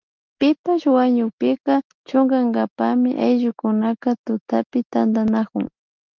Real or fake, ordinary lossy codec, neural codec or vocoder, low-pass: real; Opus, 24 kbps; none; 7.2 kHz